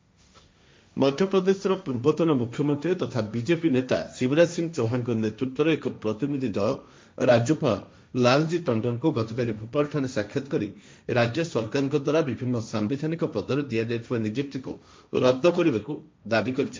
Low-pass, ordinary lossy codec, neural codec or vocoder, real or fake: none; none; codec, 16 kHz, 1.1 kbps, Voila-Tokenizer; fake